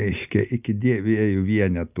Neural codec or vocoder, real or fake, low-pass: none; real; 3.6 kHz